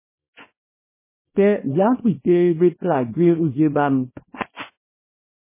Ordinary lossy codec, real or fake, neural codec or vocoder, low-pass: MP3, 16 kbps; fake; codec, 24 kHz, 0.9 kbps, WavTokenizer, small release; 3.6 kHz